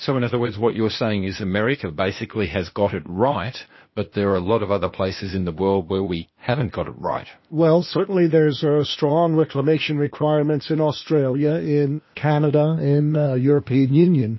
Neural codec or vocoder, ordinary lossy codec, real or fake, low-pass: codec, 16 kHz, 0.8 kbps, ZipCodec; MP3, 24 kbps; fake; 7.2 kHz